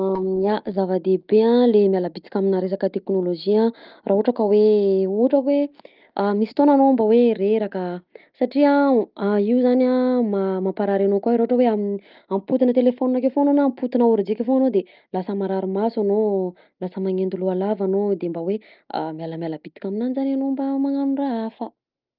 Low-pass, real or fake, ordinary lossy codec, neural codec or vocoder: 5.4 kHz; real; Opus, 24 kbps; none